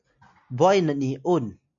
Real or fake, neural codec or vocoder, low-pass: real; none; 7.2 kHz